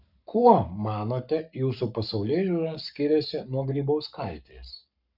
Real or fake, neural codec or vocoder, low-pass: fake; codec, 44.1 kHz, 7.8 kbps, Pupu-Codec; 5.4 kHz